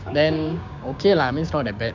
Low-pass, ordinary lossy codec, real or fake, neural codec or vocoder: 7.2 kHz; none; fake; codec, 16 kHz, 6 kbps, DAC